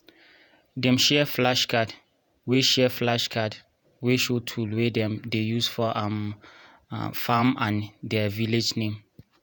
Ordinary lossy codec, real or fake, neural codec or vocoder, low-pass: none; fake; vocoder, 48 kHz, 128 mel bands, Vocos; none